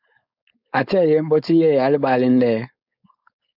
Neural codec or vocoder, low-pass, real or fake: codec, 16 kHz, 4.8 kbps, FACodec; 5.4 kHz; fake